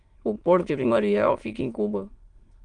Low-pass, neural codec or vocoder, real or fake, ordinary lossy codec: 9.9 kHz; autoencoder, 22.05 kHz, a latent of 192 numbers a frame, VITS, trained on many speakers; fake; Opus, 24 kbps